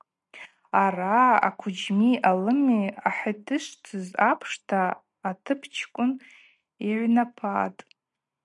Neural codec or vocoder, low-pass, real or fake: none; 10.8 kHz; real